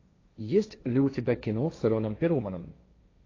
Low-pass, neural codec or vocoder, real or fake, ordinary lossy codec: 7.2 kHz; codec, 16 kHz, 1.1 kbps, Voila-Tokenizer; fake; AAC, 32 kbps